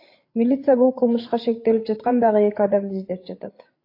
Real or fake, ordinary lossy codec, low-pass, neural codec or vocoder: fake; AAC, 32 kbps; 5.4 kHz; codec, 16 kHz, 8 kbps, FreqCodec, larger model